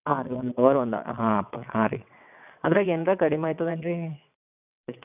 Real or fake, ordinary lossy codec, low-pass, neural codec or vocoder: fake; none; 3.6 kHz; vocoder, 22.05 kHz, 80 mel bands, WaveNeXt